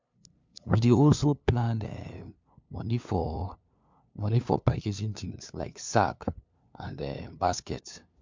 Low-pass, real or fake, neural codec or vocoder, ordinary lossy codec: 7.2 kHz; fake; codec, 16 kHz, 2 kbps, FunCodec, trained on LibriTTS, 25 frames a second; MP3, 64 kbps